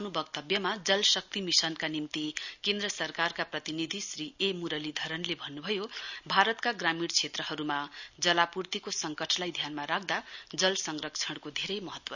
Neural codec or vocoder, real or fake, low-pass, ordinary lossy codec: none; real; 7.2 kHz; none